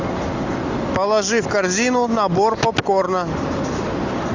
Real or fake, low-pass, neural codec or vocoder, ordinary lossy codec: real; 7.2 kHz; none; Opus, 64 kbps